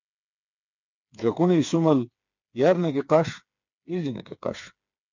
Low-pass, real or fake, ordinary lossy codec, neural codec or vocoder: 7.2 kHz; fake; MP3, 64 kbps; codec, 16 kHz, 4 kbps, FreqCodec, smaller model